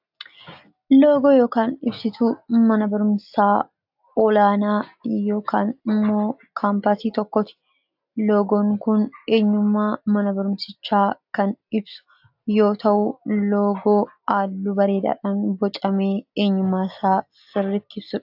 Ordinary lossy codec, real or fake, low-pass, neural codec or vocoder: AAC, 48 kbps; real; 5.4 kHz; none